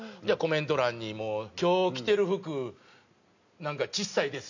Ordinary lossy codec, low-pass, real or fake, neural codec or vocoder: none; 7.2 kHz; real; none